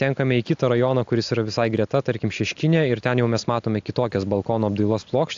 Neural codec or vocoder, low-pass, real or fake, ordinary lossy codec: none; 7.2 kHz; real; AAC, 64 kbps